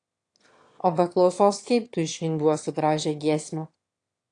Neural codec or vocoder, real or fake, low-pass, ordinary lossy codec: autoencoder, 22.05 kHz, a latent of 192 numbers a frame, VITS, trained on one speaker; fake; 9.9 kHz; AAC, 48 kbps